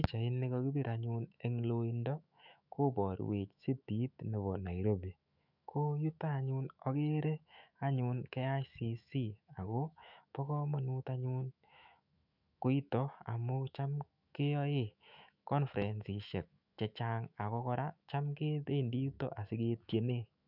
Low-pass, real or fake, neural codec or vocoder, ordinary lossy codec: 5.4 kHz; fake; autoencoder, 48 kHz, 128 numbers a frame, DAC-VAE, trained on Japanese speech; none